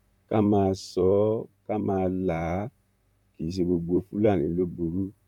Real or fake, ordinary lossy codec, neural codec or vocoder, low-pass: fake; MP3, 96 kbps; vocoder, 44.1 kHz, 128 mel bands, Pupu-Vocoder; 19.8 kHz